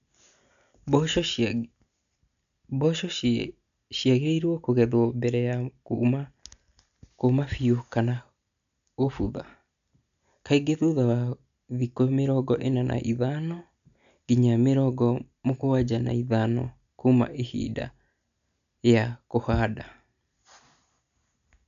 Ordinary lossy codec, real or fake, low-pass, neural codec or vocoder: MP3, 96 kbps; real; 7.2 kHz; none